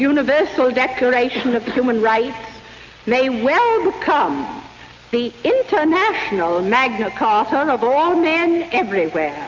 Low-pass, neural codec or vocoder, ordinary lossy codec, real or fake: 7.2 kHz; none; MP3, 48 kbps; real